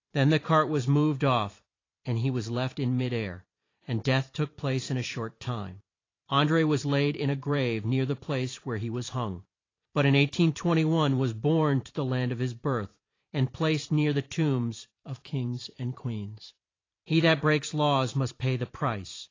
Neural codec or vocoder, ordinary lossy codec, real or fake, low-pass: vocoder, 44.1 kHz, 128 mel bands every 512 samples, BigVGAN v2; AAC, 32 kbps; fake; 7.2 kHz